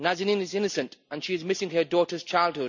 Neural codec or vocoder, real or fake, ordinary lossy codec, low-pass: none; real; none; 7.2 kHz